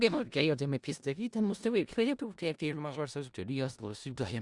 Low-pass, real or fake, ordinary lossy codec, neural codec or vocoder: 10.8 kHz; fake; Opus, 64 kbps; codec, 16 kHz in and 24 kHz out, 0.4 kbps, LongCat-Audio-Codec, four codebook decoder